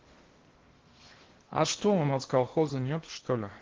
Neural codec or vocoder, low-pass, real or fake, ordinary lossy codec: codec, 16 kHz in and 24 kHz out, 0.6 kbps, FocalCodec, streaming, 2048 codes; 7.2 kHz; fake; Opus, 16 kbps